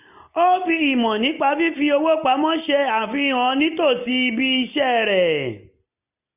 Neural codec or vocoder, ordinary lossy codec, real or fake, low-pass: none; none; real; 3.6 kHz